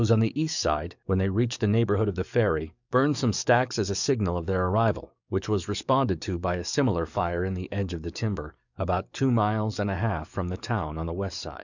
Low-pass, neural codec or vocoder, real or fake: 7.2 kHz; codec, 44.1 kHz, 7.8 kbps, DAC; fake